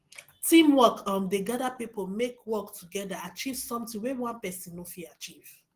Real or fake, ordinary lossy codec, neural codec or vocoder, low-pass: real; Opus, 16 kbps; none; 14.4 kHz